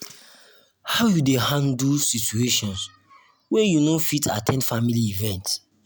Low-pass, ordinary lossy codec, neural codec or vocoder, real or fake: none; none; none; real